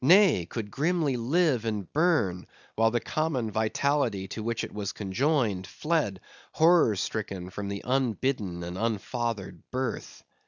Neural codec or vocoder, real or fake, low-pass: none; real; 7.2 kHz